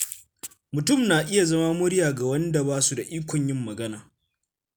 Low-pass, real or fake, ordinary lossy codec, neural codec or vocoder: none; real; none; none